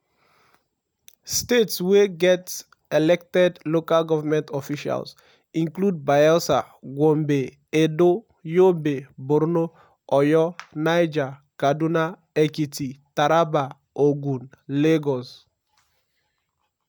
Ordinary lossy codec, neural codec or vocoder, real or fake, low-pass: none; none; real; none